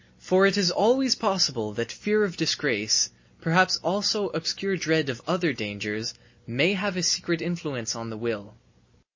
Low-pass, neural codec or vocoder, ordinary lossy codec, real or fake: 7.2 kHz; none; MP3, 32 kbps; real